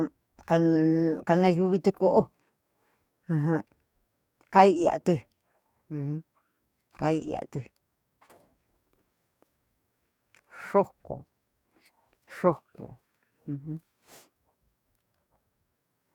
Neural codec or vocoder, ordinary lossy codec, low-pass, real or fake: codec, 44.1 kHz, 2.6 kbps, DAC; none; 19.8 kHz; fake